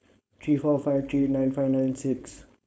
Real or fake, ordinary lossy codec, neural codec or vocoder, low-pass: fake; none; codec, 16 kHz, 4.8 kbps, FACodec; none